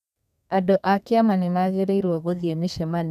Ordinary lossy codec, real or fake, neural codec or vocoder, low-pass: none; fake; codec, 32 kHz, 1.9 kbps, SNAC; 14.4 kHz